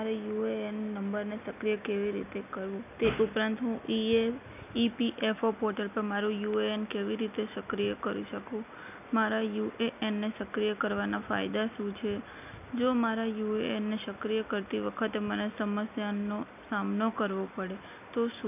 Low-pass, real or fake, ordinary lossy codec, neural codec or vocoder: 3.6 kHz; real; none; none